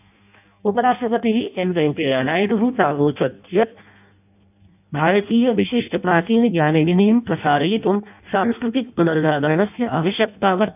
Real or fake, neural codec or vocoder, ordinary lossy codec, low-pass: fake; codec, 16 kHz in and 24 kHz out, 0.6 kbps, FireRedTTS-2 codec; none; 3.6 kHz